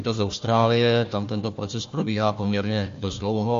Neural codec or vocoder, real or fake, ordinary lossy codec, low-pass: codec, 16 kHz, 1 kbps, FunCodec, trained on Chinese and English, 50 frames a second; fake; MP3, 48 kbps; 7.2 kHz